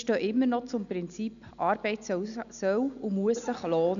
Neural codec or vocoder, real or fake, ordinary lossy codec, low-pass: none; real; none; 7.2 kHz